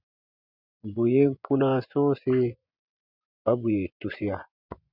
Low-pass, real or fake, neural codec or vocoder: 5.4 kHz; fake; vocoder, 24 kHz, 100 mel bands, Vocos